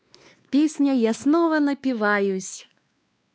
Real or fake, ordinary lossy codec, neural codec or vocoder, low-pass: fake; none; codec, 16 kHz, 2 kbps, X-Codec, WavLM features, trained on Multilingual LibriSpeech; none